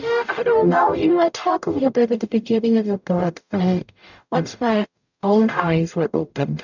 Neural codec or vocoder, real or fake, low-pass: codec, 44.1 kHz, 0.9 kbps, DAC; fake; 7.2 kHz